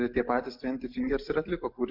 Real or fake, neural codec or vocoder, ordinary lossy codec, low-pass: real; none; MP3, 48 kbps; 5.4 kHz